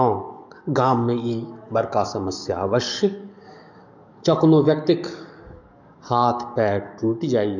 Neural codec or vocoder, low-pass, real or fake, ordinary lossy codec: codec, 44.1 kHz, 7.8 kbps, DAC; 7.2 kHz; fake; none